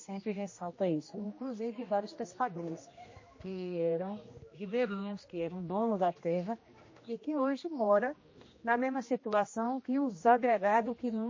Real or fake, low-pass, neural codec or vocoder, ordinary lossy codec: fake; 7.2 kHz; codec, 16 kHz, 1 kbps, X-Codec, HuBERT features, trained on general audio; MP3, 32 kbps